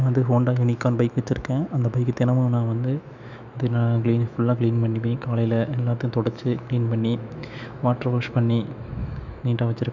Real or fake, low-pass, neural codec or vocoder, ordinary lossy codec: fake; 7.2 kHz; autoencoder, 48 kHz, 128 numbers a frame, DAC-VAE, trained on Japanese speech; none